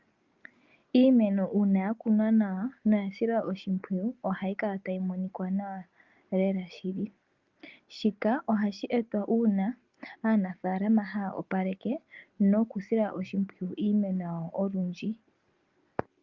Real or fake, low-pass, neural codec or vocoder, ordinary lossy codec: real; 7.2 kHz; none; Opus, 24 kbps